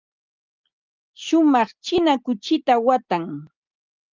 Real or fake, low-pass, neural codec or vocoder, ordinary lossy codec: real; 7.2 kHz; none; Opus, 24 kbps